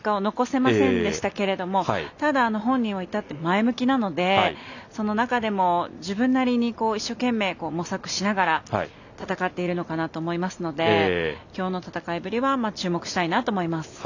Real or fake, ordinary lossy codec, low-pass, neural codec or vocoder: real; none; 7.2 kHz; none